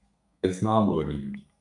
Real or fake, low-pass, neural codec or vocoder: fake; 10.8 kHz; codec, 32 kHz, 1.9 kbps, SNAC